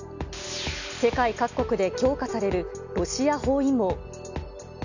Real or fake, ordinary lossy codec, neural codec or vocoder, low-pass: real; none; none; 7.2 kHz